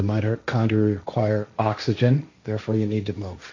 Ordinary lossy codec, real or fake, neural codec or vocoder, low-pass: AAC, 48 kbps; fake; codec, 16 kHz, 1.1 kbps, Voila-Tokenizer; 7.2 kHz